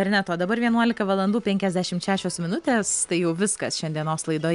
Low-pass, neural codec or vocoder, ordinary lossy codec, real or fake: 10.8 kHz; none; MP3, 96 kbps; real